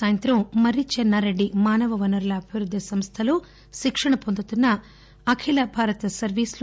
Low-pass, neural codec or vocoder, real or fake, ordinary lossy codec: none; none; real; none